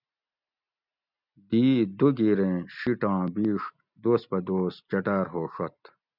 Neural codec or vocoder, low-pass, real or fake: none; 5.4 kHz; real